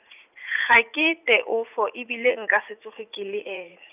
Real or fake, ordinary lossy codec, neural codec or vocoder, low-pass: fake; none; vocoder, 44.1 kHz, 80 mel bands, Vocos; 3.6 kHz